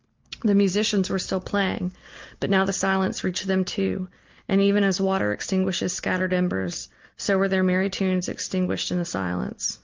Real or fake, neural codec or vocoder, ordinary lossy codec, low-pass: real; none; Opus, 24 kbps; 7.2 kHz